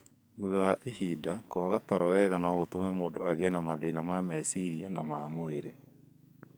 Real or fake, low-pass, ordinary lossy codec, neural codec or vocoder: fake; none; none; codec, 44.1 kHz, 2.6 kbps, SNAC